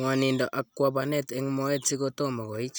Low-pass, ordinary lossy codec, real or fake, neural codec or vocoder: none; none; real; none